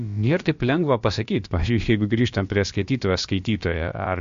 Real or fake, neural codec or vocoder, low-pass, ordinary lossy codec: fake; codec, 16 kHz, about 1 kbps, DyCAST, with the encoder's durations; 7.2 kHz; MP3, 48 kbps